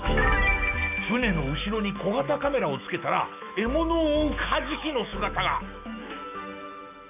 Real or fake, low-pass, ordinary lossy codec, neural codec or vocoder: fake; 3.6 kHz; none; autoencoder, 48 kHz, 128 numbers a frame, DAC-VAE, trained on Japanese speech